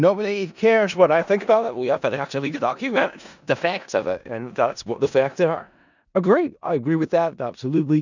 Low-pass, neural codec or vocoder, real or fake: 7.2 kHz; codec, 16 kHz in and 24 kHz out, 0.4 kbps, LongCat-Audio-Codec, four codebook decoder; fake